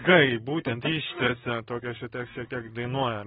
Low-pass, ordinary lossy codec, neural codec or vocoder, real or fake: 19.8 kHz; AAC, 16 kbps; vocoder, 44.1 kHz, 128 mel bands, Pupu-Vocoder; fake